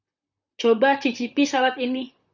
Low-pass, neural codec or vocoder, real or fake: 7.2 kHz; vocoder, 44.1 kHz, 128 mel bands, Pupu-Vocoder; fake